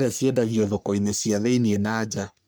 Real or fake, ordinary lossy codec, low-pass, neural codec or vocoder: fake; none; none; codec, 44.1 kHz, 3.4 kbps, Pupu-Codec